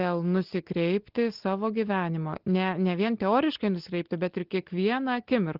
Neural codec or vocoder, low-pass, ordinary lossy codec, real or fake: none; 5.4 kHz; Opus, 16 kbps; real